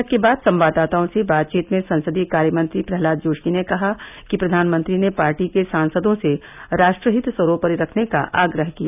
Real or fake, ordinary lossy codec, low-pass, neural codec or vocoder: real; none; 3.6 kHz; none